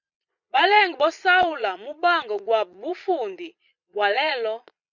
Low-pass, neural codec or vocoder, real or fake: 7.2 kHz; vocoder, 22.05 kHz, 80 mel bands, Vocos; fake